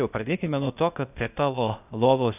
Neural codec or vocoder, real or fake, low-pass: codec, 16 kHz, 0.8 kbps, ZipCodec; fake; 3.6 kHz